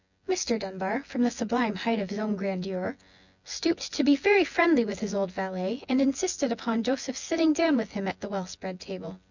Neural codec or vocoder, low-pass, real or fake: vocoder, 24 kHz, 100 mel bands, Vocos; 7.2 kHz; fake